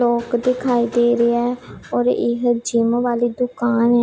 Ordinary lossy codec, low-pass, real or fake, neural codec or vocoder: none; none; real; none